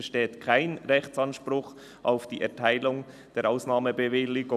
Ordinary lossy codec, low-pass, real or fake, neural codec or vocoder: none; 14.4 kHz; fake; vocoder, 44.1 kHz, 128 mel bands every 256 samples, BigVGAN v2